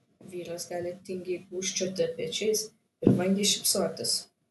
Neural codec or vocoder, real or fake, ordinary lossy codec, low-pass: none; real; AAC, 96 kbps; 14.4 kHz